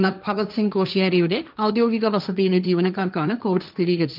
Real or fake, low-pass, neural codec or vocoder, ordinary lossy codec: fake; 5.4 kHz; codec, 16 kHz, 1.1 kbps, Voila-Tokenizer; none